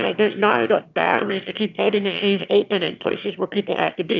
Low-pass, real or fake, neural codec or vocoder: 7.2 kHz; fake; autoencoder, 22.05 kHz, a latent of 192 numbers a frame, VITS, trained on one speaker